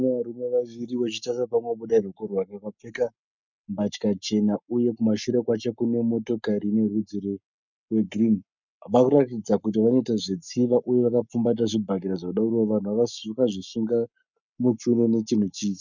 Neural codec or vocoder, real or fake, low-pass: codec, 44.1 kHz, 7.8 kbps, Pupu-Codec; fake; 7.2 kHz